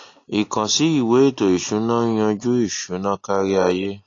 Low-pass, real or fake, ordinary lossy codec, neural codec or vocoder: 7.2 kHz; real; AAC, 32 kbps; none